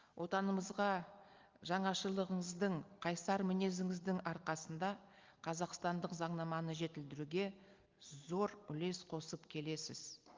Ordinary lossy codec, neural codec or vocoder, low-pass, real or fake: Opus, 32 kbps; none; 7.2 kHz; real